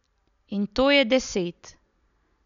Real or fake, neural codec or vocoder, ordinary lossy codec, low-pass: real; none; none; 7.2 kHz